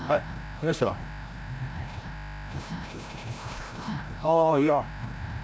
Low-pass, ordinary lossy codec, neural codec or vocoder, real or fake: none; none; codec, 16 kHz, 0.5 kbps, FreqCodec, larger model; fake